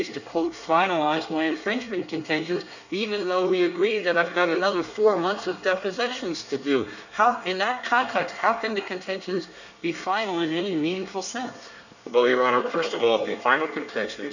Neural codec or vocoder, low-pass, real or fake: codec, 24 kHz, 1 kbps, SNAC; 7.2 kHz; fake